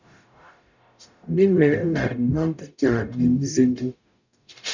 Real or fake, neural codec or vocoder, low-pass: fake; codec, 44.1 kHz, 0.9 kbps, DAC; 7.2 kHz